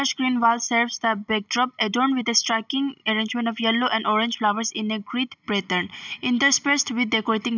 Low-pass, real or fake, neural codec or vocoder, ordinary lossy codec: 7.2 kHz; real; none; none